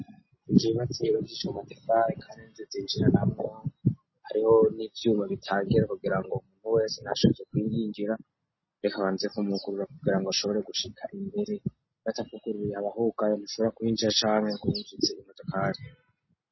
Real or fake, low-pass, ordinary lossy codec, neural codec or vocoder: real; 7.2 kHz; MP3, 24 kbps; none